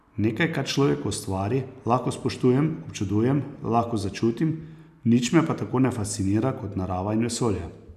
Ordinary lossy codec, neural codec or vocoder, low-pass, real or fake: none; none; 14.4 kHz; real